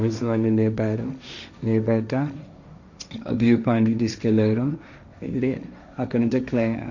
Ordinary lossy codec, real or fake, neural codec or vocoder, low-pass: none; fake; codec, 16 kHz, 1.1 kbps, Voila-Tokenizer; 7.2 kHz